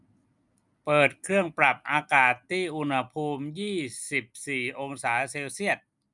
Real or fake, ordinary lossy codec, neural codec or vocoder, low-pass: real; none; none; 10.8 kHz